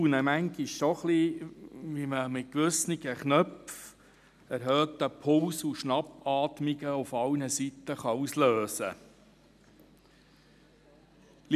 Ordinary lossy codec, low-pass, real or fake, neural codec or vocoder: none; 14.4 kHz; real; none